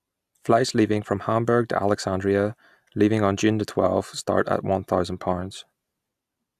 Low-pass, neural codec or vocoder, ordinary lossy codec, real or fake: 14.4 kHz; none; AAC, 96 kbps; real